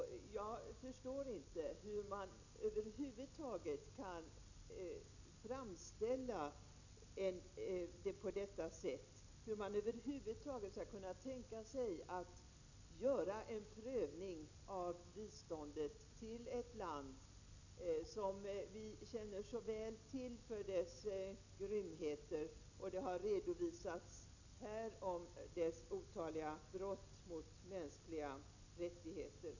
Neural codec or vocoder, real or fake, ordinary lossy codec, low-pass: none; real; none; 7.2 kHz